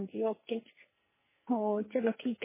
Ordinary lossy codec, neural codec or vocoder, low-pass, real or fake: MP3, 16 kbps; codec, 44.1 kHz, 2.6 kbps, SNAC; 3.6 kHz; fake